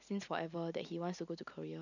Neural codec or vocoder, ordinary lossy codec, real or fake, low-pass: none; none; real; 7.2 kHz